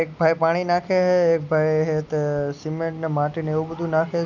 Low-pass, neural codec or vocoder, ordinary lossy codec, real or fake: 7.2 kHz; none; none; real